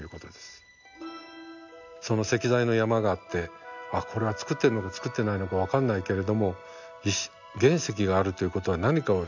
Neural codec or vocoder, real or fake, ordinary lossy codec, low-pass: none; real; none; 7.2 kHz